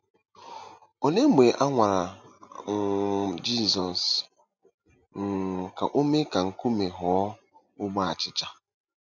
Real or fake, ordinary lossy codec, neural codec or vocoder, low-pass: real; none; none; 7.2 kHz